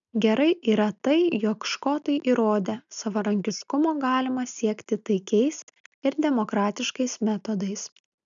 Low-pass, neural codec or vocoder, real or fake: 7.2 kHz; none; real